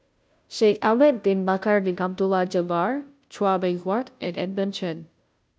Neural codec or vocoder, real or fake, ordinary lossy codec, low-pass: codec, 16 kHz, 0.5 kbps, FunCodec, trained on Chinese and English, 25 frames a second; fake; none; none